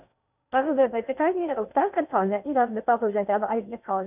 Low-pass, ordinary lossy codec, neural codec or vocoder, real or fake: 3.6 kHz; none; codec, 16 kHz in and 24 kHz out, 0.6 kbps, FocalCodec, streaming, 2048 codes; fake